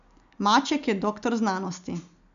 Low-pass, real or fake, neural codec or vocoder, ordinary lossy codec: 7.2 kHz; real; none; none